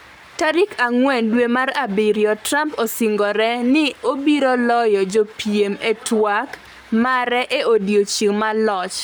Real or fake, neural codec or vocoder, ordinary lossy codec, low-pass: fake; codec, 44.1 kHz, 7.8 kbps, Pupu-Codec; none; none